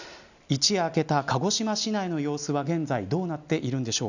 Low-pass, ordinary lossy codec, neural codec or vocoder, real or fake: 7.2 kHz; none; none; real